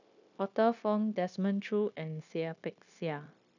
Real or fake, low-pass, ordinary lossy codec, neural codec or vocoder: fake; 7.2 kHz; none; codec, 16 kHz, 0.9 kbps, LongCat-Audio-Codec